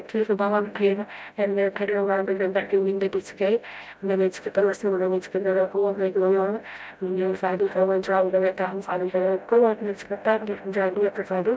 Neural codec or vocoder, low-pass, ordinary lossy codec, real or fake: codec, 16 kHz, 0.5 kbps, FreqCodec, smaller model; none; none; fake